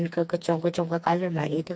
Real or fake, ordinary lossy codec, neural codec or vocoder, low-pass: fake; none; codec, 16 kHz, 2 kbps, FreqCodec, smaller model; none